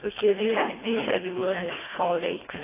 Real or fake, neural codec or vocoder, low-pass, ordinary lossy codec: fake; codec, 24 kHz, 1.5 kbps, HILCodec; 3.6 kHz; AAC, 16 kbps